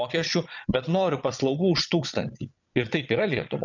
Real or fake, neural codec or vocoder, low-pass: fake; vocoder, 22.05 kHz, 80 mel bands, Vocos; 7.2 kHz